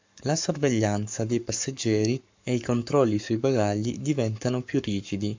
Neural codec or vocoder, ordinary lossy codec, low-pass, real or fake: codec, 44.1 kHz, 7.8 kbps, Pupu-Codec; MP3, 64 kbps; 7.2 kHz; fake